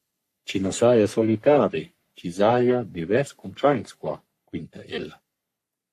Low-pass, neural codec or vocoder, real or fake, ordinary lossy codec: 14.4 kHz; codec, 44.1 kHz, 3.4 kbps, Pupu-Codec; fake; AAC, 64 kbps